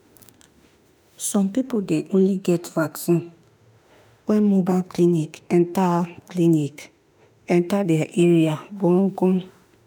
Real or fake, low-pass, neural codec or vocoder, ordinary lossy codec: fake; none; autoencoder, 48 kHz, 32 numbers a frame, DAC-VAE, trained on Japanese speech; none